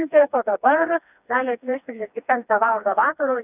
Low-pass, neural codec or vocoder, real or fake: 3.6 kHz; codec, 16 kHz, 2 kbps, FreqCodec, smaller model; fake